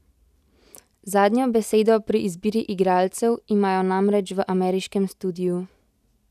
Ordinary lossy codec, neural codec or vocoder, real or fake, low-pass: none; none; real; 14.4 kHz